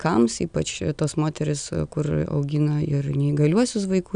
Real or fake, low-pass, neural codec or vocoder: real; 9.9 kHz; none